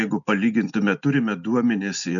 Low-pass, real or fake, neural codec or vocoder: 7.2 kHz; real; none